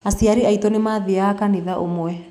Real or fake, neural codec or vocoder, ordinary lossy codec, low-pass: real; none; none; 14.4 kHz